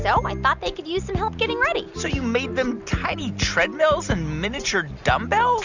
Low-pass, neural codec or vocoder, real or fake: 7.2 kHz; none; real